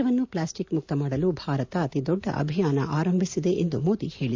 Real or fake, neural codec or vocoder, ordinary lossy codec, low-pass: real; none; AAC, 48 kbps; 7.2 kHz